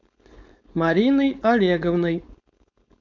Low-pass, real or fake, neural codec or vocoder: 7.2 kHz; fake; codec, 16 kHz, 4.8 kbps, FACodec